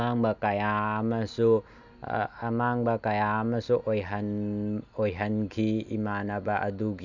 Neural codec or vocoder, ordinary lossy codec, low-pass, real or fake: none; none; 7.2 kHz; real